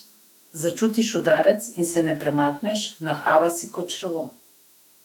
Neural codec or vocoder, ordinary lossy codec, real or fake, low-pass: autoencoder, 48 kHz, 32 numbers a frame, DAC-VAE, trained on Japanese speech; none; fake; 19.8 kHz